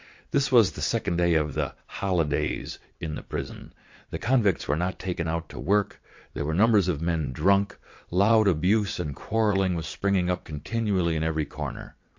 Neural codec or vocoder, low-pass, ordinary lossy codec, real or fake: vocoder, 22.05 kHz, 80 mel bands, WaveNeXt; 7.2 kHz; MP3, 48 kbps; fake